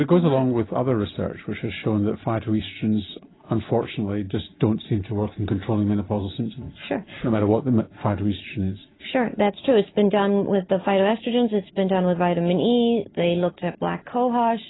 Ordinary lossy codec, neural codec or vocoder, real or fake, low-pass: AAC, 16 kbps; none; real; 7.2 kHz